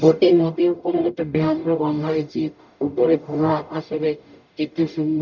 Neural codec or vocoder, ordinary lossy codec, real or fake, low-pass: codec, 44.1 kHz, 0.9 kbps, DAC; none; fake; 7.2 kHz